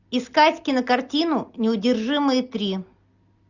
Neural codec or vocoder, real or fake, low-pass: none; real; 7.2 kHz